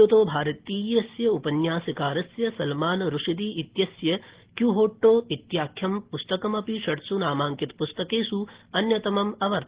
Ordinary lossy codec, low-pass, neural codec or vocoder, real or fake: Opus, 16 kbps; 3.6 kHz; none; real